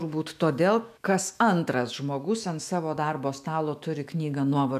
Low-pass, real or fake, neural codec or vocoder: 14.4 kHz; fake; autoencoder, 48 kHz, 128 numbers a frame, DAC-VAE, trained on Japanese speech